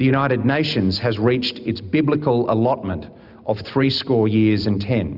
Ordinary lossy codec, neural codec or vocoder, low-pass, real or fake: Opus, 64 kbps; none; 5.4 kHz; real